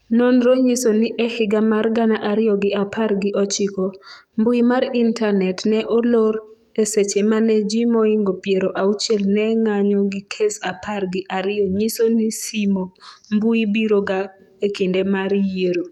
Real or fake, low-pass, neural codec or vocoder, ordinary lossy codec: fake; 19.8 kHz; codec, 44.1 kHz, 7.8 kbps, DAC; none